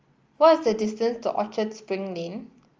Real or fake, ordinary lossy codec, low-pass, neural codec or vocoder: real; Opus, 24 kbps; 7.2 kHz; none